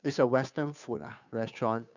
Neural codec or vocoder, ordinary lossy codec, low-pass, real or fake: codec, 16 kHz, 2 kbps, FunCodec, trained on Chinese and English, 25 frames a second; none; 7.2 kHz; fake